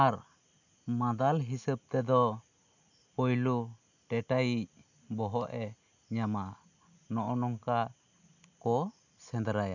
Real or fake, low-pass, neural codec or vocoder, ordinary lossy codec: real; 7.2 kHz; none; none